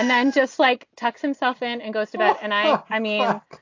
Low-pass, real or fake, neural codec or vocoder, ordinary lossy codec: 7.2 kHz; real; none; AAC, 48 kbps